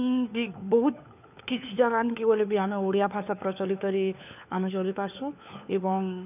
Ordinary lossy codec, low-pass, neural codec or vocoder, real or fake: none; 3.6 kHz; codec, 16 kHz, 4 kbps, FunCodec, trained on LibriTTS, 50 frames a second; fake